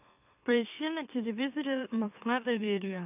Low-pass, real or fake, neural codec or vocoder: 3.6 kHz; fake; autoencoder, 44.1 kHz, a latent of 192 numbers a frame, MeloTTS